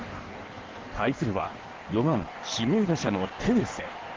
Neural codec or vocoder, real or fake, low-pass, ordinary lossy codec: codec, 16 kHz in and 24 kHz out, 1.1 kbps, FireRedTTS-2 codec; fake; 7.2 kHz; Opus, 16 kbps